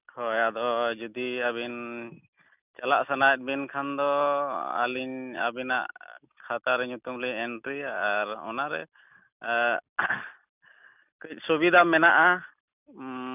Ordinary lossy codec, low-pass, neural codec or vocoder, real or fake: none; 3.6 kHz; none; real